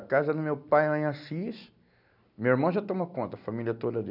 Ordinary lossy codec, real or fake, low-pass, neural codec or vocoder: none; real; 5.4 kHz; none